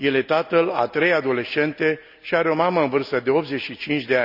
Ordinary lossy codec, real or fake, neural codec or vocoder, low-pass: none; real; none; 5.4 kHz